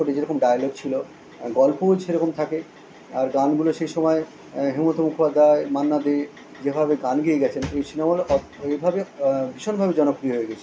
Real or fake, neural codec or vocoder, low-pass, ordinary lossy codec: real; none; none; none